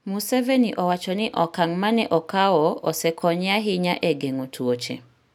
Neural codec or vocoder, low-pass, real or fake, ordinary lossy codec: none; 19.8 kHz; real; none